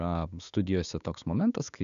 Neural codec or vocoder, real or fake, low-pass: codec, 16 kHz, 6 kbps, DAC; fake; 7.2 kHz